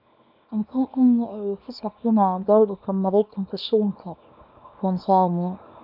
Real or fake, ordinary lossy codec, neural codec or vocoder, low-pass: fake; none; codec, 24 kHz, 0.9 kbps, WavTokenizer, small release; 5.4 kHz